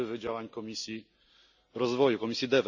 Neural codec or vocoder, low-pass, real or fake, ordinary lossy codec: none; 7.2 kHz; real; none